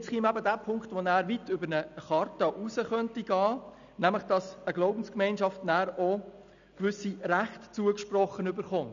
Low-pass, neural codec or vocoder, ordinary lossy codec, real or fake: 7.2 kHz; none; none; real